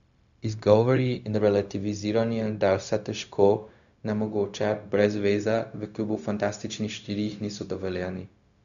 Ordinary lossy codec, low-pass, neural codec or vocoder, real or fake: none; 7.2 kHz; codec, 16 kHz, 0.4 kbps, LongCat-Audio-Codec; fake